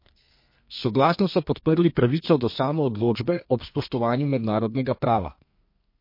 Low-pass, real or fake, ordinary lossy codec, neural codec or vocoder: 5.4 kHz; fake; MP3, 32 kbps; codec, 32 kHz, 1.9 kbps, SNAC